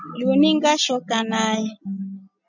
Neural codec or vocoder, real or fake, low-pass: none; real; 7.2 kHz